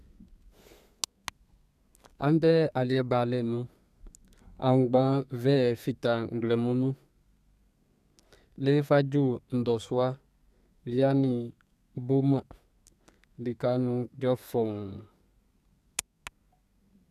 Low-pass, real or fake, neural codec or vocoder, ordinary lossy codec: 14.4 kHz; fake; codec, 32 kHz, 1.9 kbps, SNAC; none